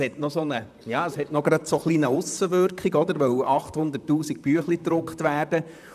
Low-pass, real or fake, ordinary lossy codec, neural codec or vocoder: 14.4 kHz; fake; none; vocoder, 44.1 kHz, 128 mel bands, Pupu-Vocoder